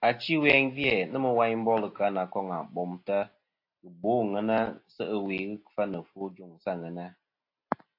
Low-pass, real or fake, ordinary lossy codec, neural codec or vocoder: 5.4 kHz; real; AAC, 32 kbps; none